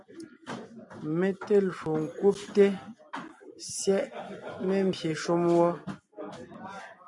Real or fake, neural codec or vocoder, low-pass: real; none; 10.8 kHz